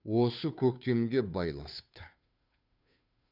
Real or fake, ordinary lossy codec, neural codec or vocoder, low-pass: fake; none; codec, 16 kHz, 2 kbps, FunCodec, trained on Chinese and English, 25 frames a second; 5.4 kHz